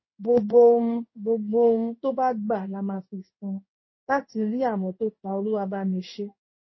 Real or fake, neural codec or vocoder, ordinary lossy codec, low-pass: fake; codec, 16 kHz in and 24 kHz out, 1 kbps, XY-Tokenizer; MP3, 24 kbps; 7.2 kHz